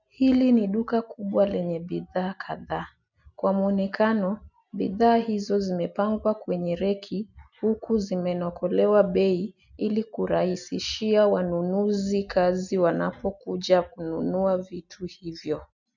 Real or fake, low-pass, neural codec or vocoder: real; 7.2 kHz; none